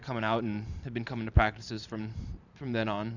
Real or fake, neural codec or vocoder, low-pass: fake; vocoder, 44.1 kHz, 128 mel bands every 512 samples, BigVGAN v2; 7.2 kHz